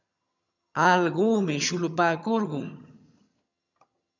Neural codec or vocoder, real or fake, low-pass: vocoder, 22.05 kHz, 80 mel bands, HiFi-GAN; fake; 7.2 kHz